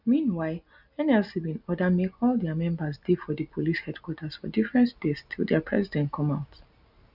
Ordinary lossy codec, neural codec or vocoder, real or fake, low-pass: none; none; real; 5.4 kHz